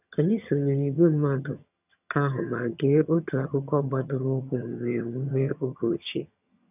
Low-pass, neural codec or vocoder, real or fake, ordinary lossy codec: 3.6 kHz; vocoder, 22.05 kHz, 80 mel bands, HiFi-GAN; fake; none